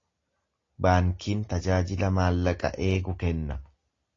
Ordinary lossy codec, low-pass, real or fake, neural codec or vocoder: AAC, 32 kbps; 7.2 kHz; real; none